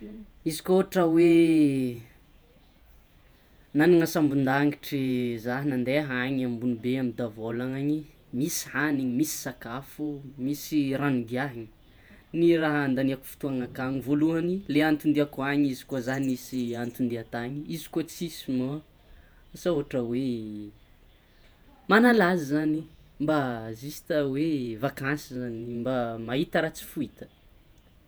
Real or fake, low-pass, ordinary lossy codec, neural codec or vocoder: fake; none; none; vocoder, 48 kHz, 128 mel bands, Vocos